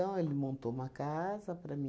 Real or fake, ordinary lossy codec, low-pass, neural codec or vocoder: real; none; none; none